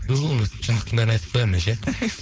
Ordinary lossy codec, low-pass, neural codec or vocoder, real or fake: none; none; codec, 16 kHz, 4.8 kbps, FACodec; fake